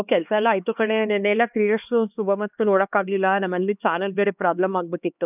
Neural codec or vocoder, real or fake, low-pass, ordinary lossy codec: codec, 16 kHz, 2 kbps, X-Codec, HuBERT features, trained on LibriSpeech; fake; 3.6 kHz; none